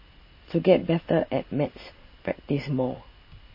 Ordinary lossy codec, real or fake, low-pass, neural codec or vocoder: MP3, 24 kbps; real; 5.4 kHz; none